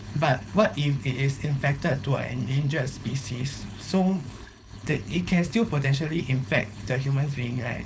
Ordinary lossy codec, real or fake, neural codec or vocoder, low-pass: none; fake; codec, 16 kHz, 4.8 kbps, FACodec; none